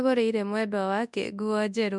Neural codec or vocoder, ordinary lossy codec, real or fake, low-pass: codec, 24 kHz, 0.9 kbps, WavTokenizer, large speech release; none; fake; 10.8 kHz